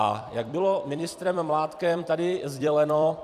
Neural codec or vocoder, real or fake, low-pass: vocoder, 44.1 kHz, 128 mel bands every 256 samples, BigVGAN v2; fake; 14.4 kHz